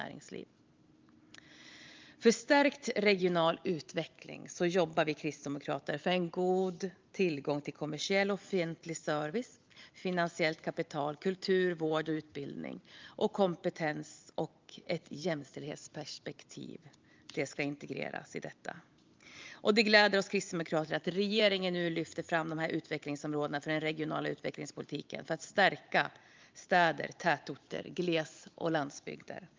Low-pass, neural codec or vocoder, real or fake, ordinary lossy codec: 7.2 kHz; vocoder, 44.1 kHz, 128 mel bands every 512 samples, BigVGAN v2; fake; Opus, 24 kbps